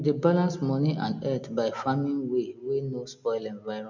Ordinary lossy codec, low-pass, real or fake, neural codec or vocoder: none; 7.2 kHz; real; none